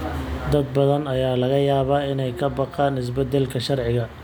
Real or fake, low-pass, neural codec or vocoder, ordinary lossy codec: real; none; none; none